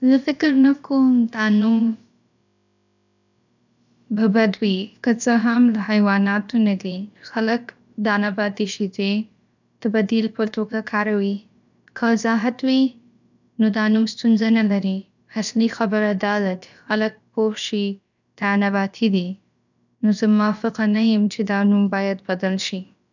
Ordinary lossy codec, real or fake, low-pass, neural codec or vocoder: none; fake; 7.2 kHz; codec, 16 kHz, about 1 kbps, DyCAST, with the encoder's durations